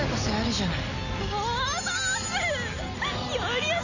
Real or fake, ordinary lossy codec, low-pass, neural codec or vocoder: real; none; 7.2 kHz; none